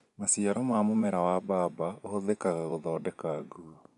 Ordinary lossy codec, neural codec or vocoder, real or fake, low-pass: none; vocoder, 24 kHz, 100 mel bands, Vocos; fake; 10.8 kHz